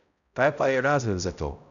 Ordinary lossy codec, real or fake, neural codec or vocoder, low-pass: none; fake; codec, 16 kHz, 0.5 kbps, X-Codec, HuBERT features, trained on balanced general audio; 7.2 kHz